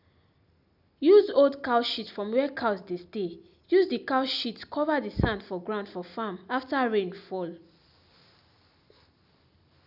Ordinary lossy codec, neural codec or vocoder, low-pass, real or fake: none; none; 5.4 kHz; real